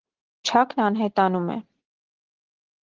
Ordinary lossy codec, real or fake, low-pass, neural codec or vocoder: Opus, 16 kbps; real; 7.2 kHz; none